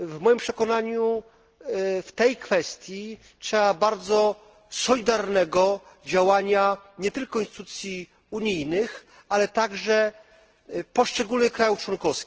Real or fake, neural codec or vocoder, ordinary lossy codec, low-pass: real; none; Opus, 16 kbps; 7.2 kHz